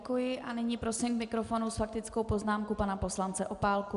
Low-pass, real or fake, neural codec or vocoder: 10.8 kHz; real; none